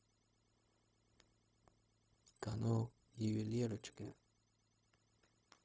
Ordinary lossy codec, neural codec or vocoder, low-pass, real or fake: none; codec, 16 kHz, 0.4 kbps, LongCat-Audio-Codec; none; fake